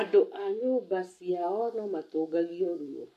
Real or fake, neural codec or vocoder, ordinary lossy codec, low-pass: fake; codec, 44.1 kHz, 7.8 kbps, Pupu-Codec; MP3, 96 kbps; 14.4 kHz